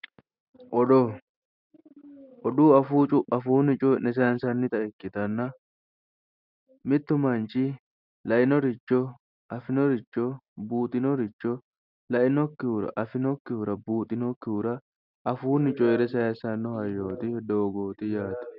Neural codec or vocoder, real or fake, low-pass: none; real; 5.4 kHz